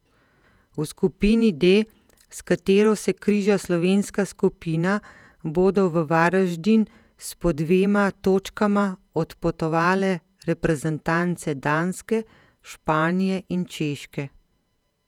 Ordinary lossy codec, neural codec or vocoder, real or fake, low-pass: none; vocoder, 48 kHz, 128 mel bands, Vocos; fake; 19.8 kHz